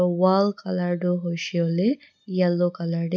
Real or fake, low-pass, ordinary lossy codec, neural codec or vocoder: real; none; none; none